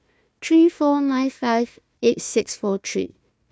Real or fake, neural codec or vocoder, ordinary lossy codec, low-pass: fake; codec, 16 kHz, 1 kbps, FunCodec, trained on Chinese and English, 50 frames a second; none; none